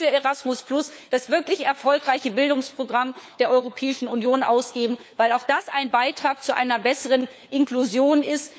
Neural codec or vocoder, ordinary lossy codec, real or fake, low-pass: codec, 16 kHz, 4 kbps, FunCodec, trained on LibriTTS, 50 frames a second; none; fake; none